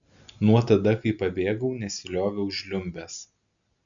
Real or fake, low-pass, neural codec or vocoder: real; 7.2 kHz; none